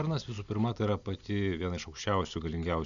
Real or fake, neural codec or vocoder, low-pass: real; none; 7.2 kHz